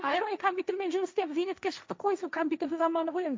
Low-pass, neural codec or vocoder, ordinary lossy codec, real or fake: none; codec, 16 kHz, 1.1 kbps, Voila-Tokenizer; none; fake